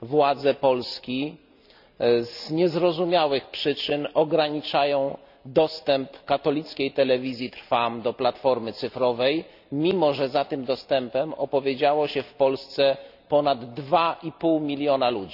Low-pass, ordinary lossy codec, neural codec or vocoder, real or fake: 5.4 kHz; none; none; real